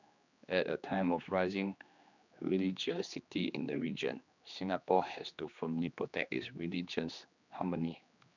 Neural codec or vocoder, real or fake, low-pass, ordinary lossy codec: codec, 16 kHz, 2 kbps, X-Codec, HuBERT features, trained on general audio; fake; 7.2 kHz; none